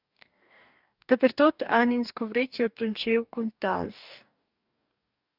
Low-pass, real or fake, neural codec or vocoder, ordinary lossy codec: 5.4 kHz; fake; codec, 44.1 kHz, 2.6 kbps, DAC; Opus, 64 kbps